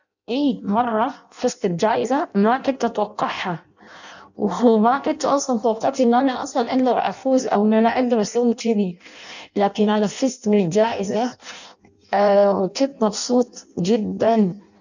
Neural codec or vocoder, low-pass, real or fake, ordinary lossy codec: codec, 16 kHz in and 24 kHz out, 0.6 kbps, FireRedTTS-2 codec; 7.2 kHz; fake; none